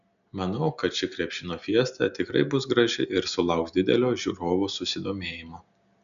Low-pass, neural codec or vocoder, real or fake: 7.2 kHz; none; real